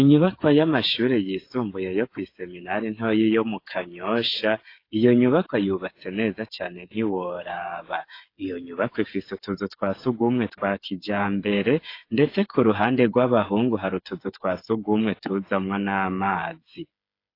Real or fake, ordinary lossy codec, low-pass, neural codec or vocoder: fake; AAC, 32 kbps; 5.4 kHz; codec, 16 kHz, 8 kbps, FreqCodec, smaller model